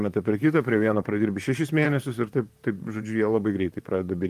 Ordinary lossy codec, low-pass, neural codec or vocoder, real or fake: Opus, 32 kbps; 14.4 kHz; vocoder, 44.1 kHz, 128 mel bands, Pupu-Vocoder; fake